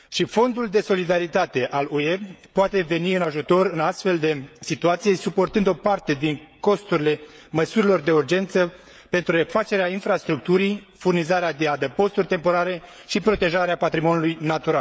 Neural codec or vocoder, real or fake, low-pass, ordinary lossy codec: codec, 16 kHz, 16 kbps, FreqCodec, smaller model; fake; none; none